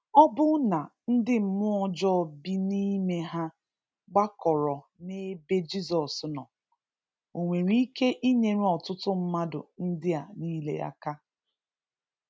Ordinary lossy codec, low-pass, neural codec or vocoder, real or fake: none; none; none; real